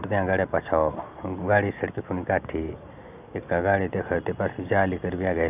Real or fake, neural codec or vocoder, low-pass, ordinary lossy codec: real; none; 3.6 kHz; none